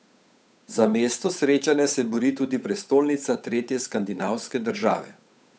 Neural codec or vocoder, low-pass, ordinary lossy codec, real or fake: codec, 16 kHz, 8 kbps, FunCodec, trained on Chinese and English, 25 frames a second; none; none; fake